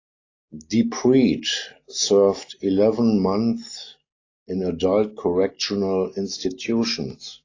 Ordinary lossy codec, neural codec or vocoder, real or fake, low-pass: AAC, 32 kbps; none; real; 7.2 kHz